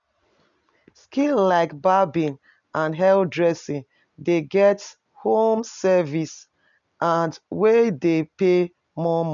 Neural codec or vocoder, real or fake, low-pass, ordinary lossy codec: none; real; 7.2 kHz; none